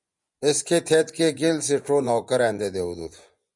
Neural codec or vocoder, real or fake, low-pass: vocoder, 24 kHz, 100 mel bands, Vocos; fake; 10.8 kHz